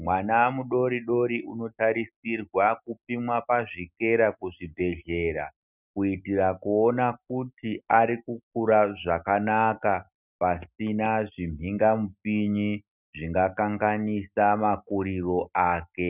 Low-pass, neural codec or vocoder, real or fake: 3.6 kHz; none; real